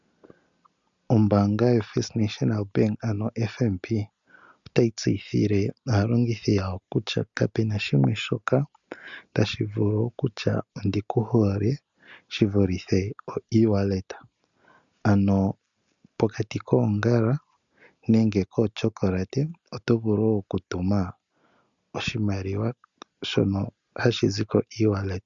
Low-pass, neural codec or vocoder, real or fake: 7.2 kHz; none; real